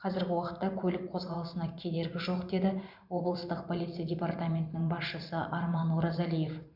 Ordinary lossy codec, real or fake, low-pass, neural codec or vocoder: none; fake; 5.4 kHz; vocoder, 44.1 kHz, 128 mel bands every 512 samples, BigVGAN v2